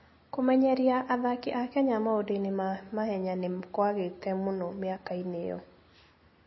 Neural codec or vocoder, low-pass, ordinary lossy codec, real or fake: none; 7.2 kHz; MP3, 24 kbps; real